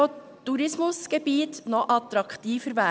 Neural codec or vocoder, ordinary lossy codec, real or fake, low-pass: none; none; real; none